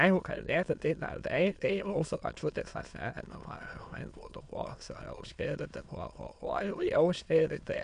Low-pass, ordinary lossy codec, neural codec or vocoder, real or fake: 9.9 kHz; MP3, 64 kbps; autoencoder, 22.05 kHz, a latent of 192 numbers a frame, VITS, trained on many speakers; fake